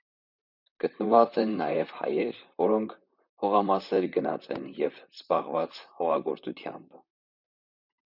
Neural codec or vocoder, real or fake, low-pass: vocoder, 44.1 kHz, 128 mel bands, Pupu-Vocoder; fake; 5.4 kHz